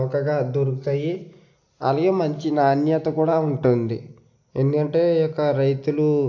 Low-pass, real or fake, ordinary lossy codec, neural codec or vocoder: 7.2 kHz; real; AAC, 48 kbps; none